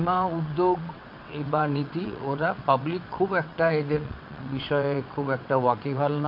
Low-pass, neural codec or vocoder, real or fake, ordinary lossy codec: 5.4 kHz; vocoder, 22.05 kHz, 80 mel bands, WaveNeXt; fake; MP3, 48 kbps